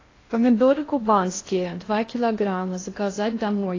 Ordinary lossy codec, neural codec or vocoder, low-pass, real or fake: AAC, 32 kbps; codec, 16 kHz in and 24 kHz out, 0.6 kbps, FocalCodec, streaming, 2048 codes; 7.2 kHz; fake